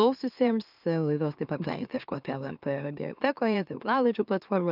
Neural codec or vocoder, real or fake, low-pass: autoencoder, 44.1 kHz, a latent of 192 numbers a frame, MeloTTS; fake; 5.4 kHz